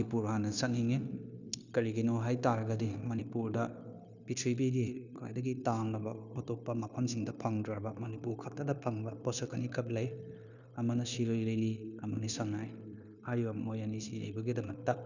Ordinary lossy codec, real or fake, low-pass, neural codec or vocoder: none; fake; 7.2 kHz; codec, 16 kHz, 0.9 kbps, LongCat-Audio-Codec